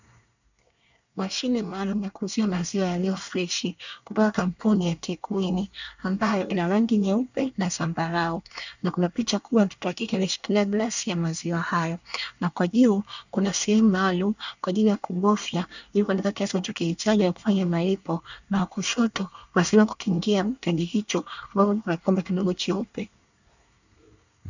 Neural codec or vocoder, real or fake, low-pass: codec, 24 kHz, 1 kbps, SNAC; fake; 7.2 kHz